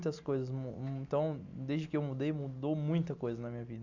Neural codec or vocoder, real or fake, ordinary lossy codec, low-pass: none; real; MP3, 64 kbps; 7.2 kHz